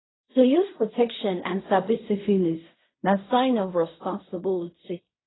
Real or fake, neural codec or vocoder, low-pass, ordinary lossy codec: fake; codec, 16 kHz in and 24 kHz out, 0.4 kbps, LongCat-Audio-Codec, fine tuned four codebook decoder; 7.2 kHz; AAC, 16 kbps